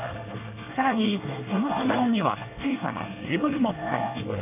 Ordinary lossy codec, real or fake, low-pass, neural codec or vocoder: none; fake; 3.6 kHz; codec, 24 kHz, 1 kbps, SNAC